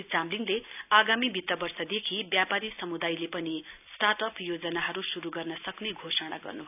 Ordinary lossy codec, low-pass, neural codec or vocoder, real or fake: none; 3.6 kHz; none; real